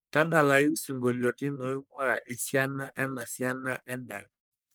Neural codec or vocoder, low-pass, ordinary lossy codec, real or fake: codec, 44.1 kHz, 1.7 kbps, Pupu-Codec; none; none; fake